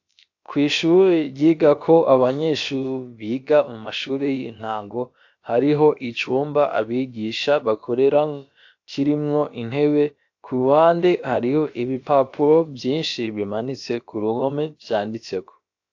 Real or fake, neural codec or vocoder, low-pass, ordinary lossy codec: fake; codec, 16 kHz, about 1 kbps, DyCAST, with the encoder's durations; 7.2 kHz; AAC, 48 kbps